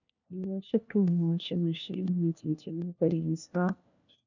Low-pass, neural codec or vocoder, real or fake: 7.2 kHz; codec, 16 kHz, 1 kbps, FunCodec, trained on LibriTTS, 50 frames a second; fake